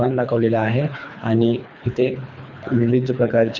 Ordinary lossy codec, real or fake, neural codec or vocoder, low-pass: none; fake; codec, 24 kHz, 3 kbps, HILCodec; 7.2 kHz